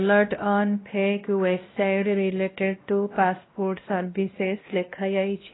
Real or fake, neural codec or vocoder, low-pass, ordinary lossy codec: fake; codec, 16 kHz, 1 kbps, X-Codec, WavLM features, trained on Multilingual LibriSpeech; 7.2 kHz; AAC, 16 kbps